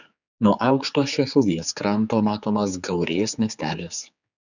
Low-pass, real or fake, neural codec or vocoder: 7.2 kHz; fake; codec, 16 kHz, 4 kbps, X-Codec, HuBERT features, trained on general audio